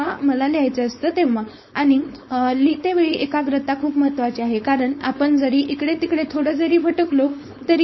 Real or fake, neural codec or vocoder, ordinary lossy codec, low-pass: fake; codec, 16 kHz, 4.8 kbps, FACodec; MP3, 24 kbps; 7.2 kHz